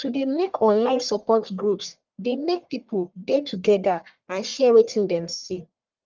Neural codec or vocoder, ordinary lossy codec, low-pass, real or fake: codec, 44.1 kHz, 1.7 kbps, Pupu-Codec; Opus, 32 kbps; 7.2 kHz; fake